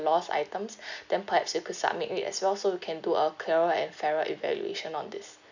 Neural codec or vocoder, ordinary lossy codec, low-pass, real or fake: none; none; 7.2 kHz; real